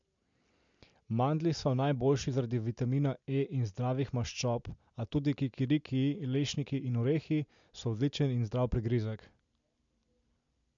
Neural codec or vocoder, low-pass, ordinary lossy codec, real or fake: none; 7.2 kHz; MP3, 64 kbps; real